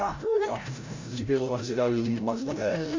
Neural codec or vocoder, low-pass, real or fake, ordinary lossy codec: codec, 16 kHz, 0.5 kbps, FreqCodec, larger model; 7.2 kHz; fake; MP3, 48 kbps